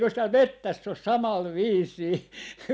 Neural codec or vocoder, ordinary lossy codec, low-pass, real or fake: none; none; none; real